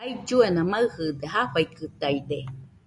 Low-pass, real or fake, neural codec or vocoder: 10.8 kHz; real; none